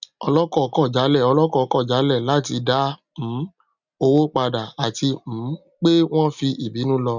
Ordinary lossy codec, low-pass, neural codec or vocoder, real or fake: none; 7.2 kHz; none; real